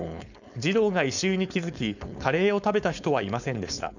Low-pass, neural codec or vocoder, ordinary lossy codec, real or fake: 7.2 kHz; codec, 16 kHz, 4.8 kbps, FACodec; none; fake